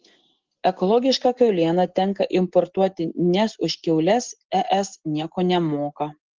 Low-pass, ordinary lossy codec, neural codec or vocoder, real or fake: 7.2 kHz; Opus, 16 kbps; none; real